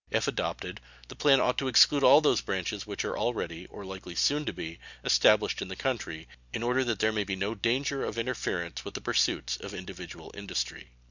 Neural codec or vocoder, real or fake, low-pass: none; real; 7.2 kHz